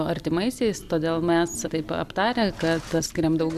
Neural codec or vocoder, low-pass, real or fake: none; 14.4 kHz; real